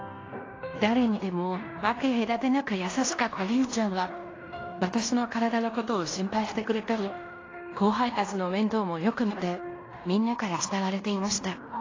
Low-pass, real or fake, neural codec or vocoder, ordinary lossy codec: 7.2 kHz; fake; codec, 16 kHz in and 24 kHz out, 0.9 kbps, LongCat-Audio-Codec, fine tuned four codebook decoder; AAC, 32 kbps